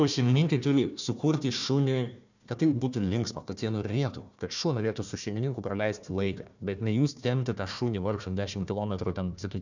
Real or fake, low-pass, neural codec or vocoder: fake; 7.2 kHz; codec, 16 kHz, 1 kbps, FunCodec, trained on Chinese and English, 50 frames a second